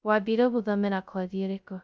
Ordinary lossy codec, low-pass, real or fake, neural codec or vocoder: none; none; fake; codec, 16 kHz, 0.2 kbps, FocalCodec